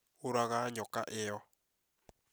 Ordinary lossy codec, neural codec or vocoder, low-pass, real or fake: none; none; none; real